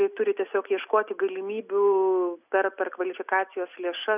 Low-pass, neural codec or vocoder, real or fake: 3.6 kHz; none; real